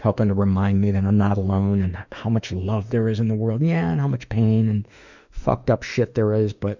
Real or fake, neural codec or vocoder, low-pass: fake; autoencoder, 48 kHz, 32 numbers a frame, DAC-VAE, trained on Japanese speech; 7.2 kHz